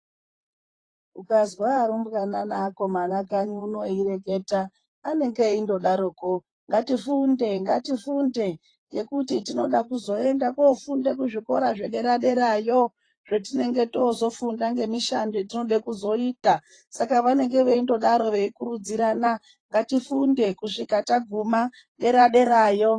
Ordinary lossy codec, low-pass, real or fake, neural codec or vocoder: AAC, 32 kbps; 9.9 kHz; fake; vocoder, 44.1 kHz, 128 mel bands, Pupu-Vocoder